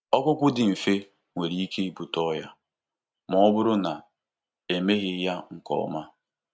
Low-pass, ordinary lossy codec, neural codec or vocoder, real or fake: none; none; none; real